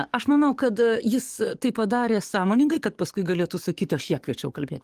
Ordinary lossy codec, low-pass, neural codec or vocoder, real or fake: Opus, 24 kbps; 14.4 kHz; codec, 44.1 kHz, 7.8 kbps, DAC; fake